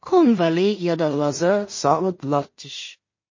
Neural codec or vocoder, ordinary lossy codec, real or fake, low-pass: codec, 16 kHz in and 24 kHz out, 0.4 kbps, LongCat-Audio-Codec, two codebook decoder; MP3, 32 kbps; fake; 7.2 kHz